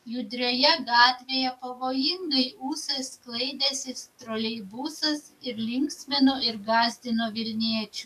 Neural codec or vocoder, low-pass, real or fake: codec, 44.1 kHz, 7.8 kbps, DAC; 14.4 kHz; fake